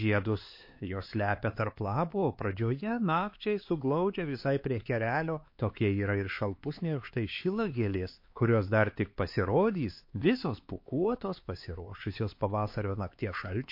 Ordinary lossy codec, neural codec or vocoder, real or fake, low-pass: MP3, 32 kbps; codec, 16 kHz, 4 kbps, X-Codec, HuBERT features, trained on LibriSpeech; fake; 5.4 kHz